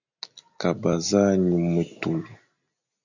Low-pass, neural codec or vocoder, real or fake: 7.2 kHz; none; real